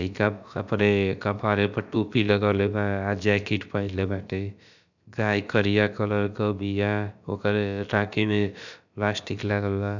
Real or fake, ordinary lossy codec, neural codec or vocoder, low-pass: fake; none; codec, 16 kHz, about 1 kbps, DyCAST, with the encoder's durations; 7.2 kHz